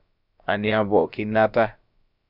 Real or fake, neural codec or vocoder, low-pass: fake; codec, 16 kHz, about 1 kbps, DyCAST, with the encoder's durations; 5.4 kHz